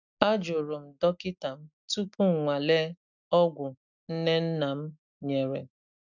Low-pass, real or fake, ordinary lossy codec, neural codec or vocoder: 7.2 kHz; real; none; none